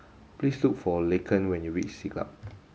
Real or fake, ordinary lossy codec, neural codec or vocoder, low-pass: real; none; none; none